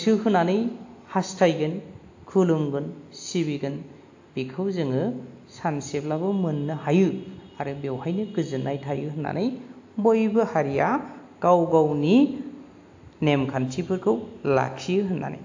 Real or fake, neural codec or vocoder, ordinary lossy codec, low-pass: real; none; AAC, 48 kbps; 7.2 kHz